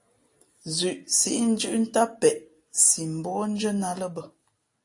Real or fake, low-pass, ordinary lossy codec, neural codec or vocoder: real; 10.8 kHz; AAC, 48 kbps; none